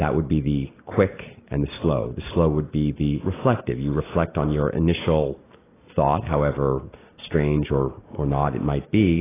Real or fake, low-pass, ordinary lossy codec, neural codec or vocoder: real; 3.6 kHz; AAC, 16 kbps; none